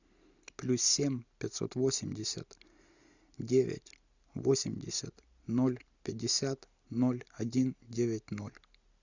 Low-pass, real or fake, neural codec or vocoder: 7.2 kHz; real; none